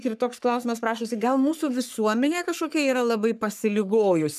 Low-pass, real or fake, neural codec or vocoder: 14.4 kHz; fake; codec, 44.1 kHz, 3.4 kbps, Pupu-Codec